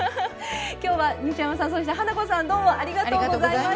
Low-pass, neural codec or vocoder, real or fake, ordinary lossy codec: none; none; real; none